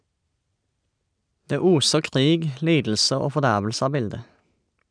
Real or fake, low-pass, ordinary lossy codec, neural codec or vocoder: real; 9.9 kHz; none; none